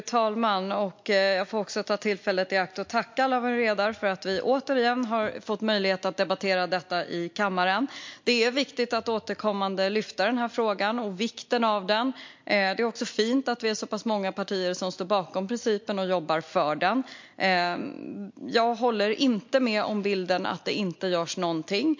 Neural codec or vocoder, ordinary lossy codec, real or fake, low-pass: none; MP3, 48 kbps; real; 7.2 kHz